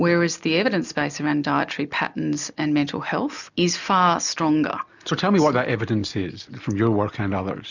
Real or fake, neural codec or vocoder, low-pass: real; none; 7.2 kHz